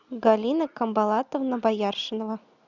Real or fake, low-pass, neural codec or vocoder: real; 7.2 kHz; none